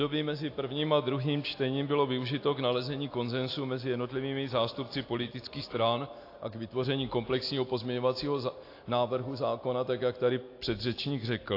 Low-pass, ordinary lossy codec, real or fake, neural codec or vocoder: 5.4 kHz; AAC, 32 kbps; real; none